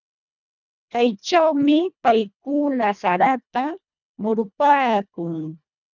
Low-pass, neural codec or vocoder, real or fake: 7.2 kHz; codec, 24 kHz, 1.5 kbps, HILCodec; fake